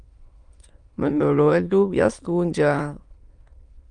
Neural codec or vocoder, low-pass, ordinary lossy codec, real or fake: autoencoder, 22.05 kHz, a latent of 192 numbers a frame, VITS, trained on many speakers; 9.9 kHz; Opus, 32 kbps; fake